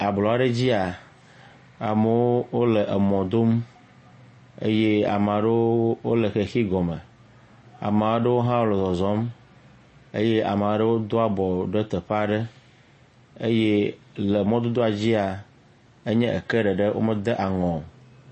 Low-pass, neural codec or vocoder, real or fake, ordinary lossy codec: 10.8 kHz; none; real; MP3, 32 kbps